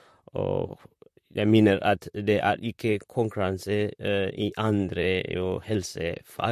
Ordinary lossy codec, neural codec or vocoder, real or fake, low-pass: MP3, 64 kbps; vocoder, 44.1 kHz, 128 mel bands, Pupu-Vocoder; fake; 14.4 kHz